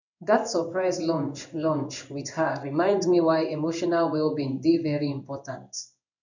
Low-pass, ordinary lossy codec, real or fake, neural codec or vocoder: 7.2 kHz; none; fake; codec, 16 kHz in and 24 kHz out, 1 kbps, XY-Tokenizer